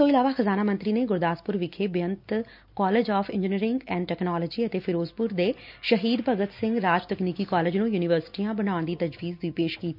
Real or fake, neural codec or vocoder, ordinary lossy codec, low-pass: real; none; none; 5.4 kHz